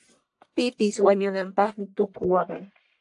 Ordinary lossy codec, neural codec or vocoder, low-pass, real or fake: AAC, 64 kbps; codec, 44.1 kHz, 1.7 kbps, Pupu-Codec; 10.8 kHz; fake